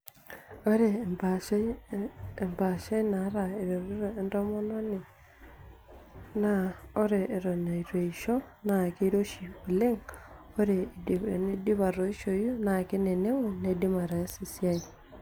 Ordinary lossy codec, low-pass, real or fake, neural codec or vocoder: none; none; real; none